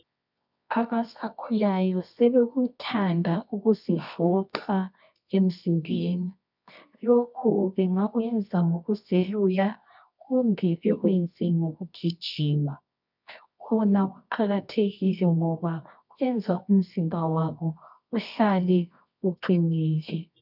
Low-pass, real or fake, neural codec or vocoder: 5.4 kHz; fake; codec, 24 kHz, 0.9 kbps, WavTokenizer, medium music audio release